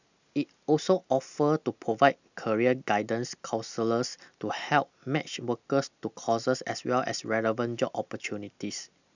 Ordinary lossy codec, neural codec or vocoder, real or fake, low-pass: none; none; real; 7.2 kHz